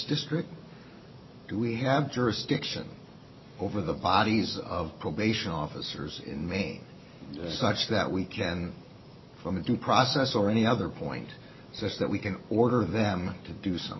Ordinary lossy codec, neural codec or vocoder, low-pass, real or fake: MP3, 24 kbps; none; 7.2 kHz; real